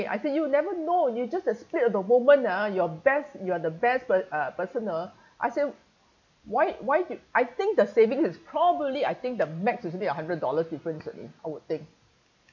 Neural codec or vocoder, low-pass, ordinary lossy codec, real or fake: none; 7.2 kHz; none; real